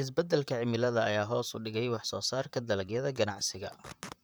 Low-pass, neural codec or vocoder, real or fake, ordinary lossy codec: none; vocoder, 44.1 kHz, 128 mel bands, Pupu-Vocoder; fake; none